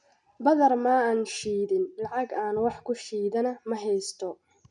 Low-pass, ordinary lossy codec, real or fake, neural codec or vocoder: 9.9 kHz; none; real; none